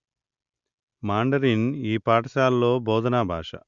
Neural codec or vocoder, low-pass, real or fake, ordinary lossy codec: none; 7.2 kHz; real; Opus, 64 kbps